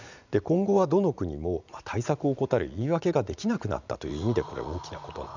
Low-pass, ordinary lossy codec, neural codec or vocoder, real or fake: 7.2 kHz; none; vocoder, 44.1 kHz, 80 mel bands, Vocos; fake